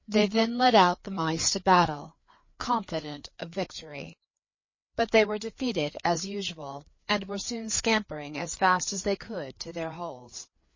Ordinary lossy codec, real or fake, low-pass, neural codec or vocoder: MP3, 32 kbps; fake; 7.2 kHz; codec, 16 kHz, 4 kbps, FreqCodec, larger model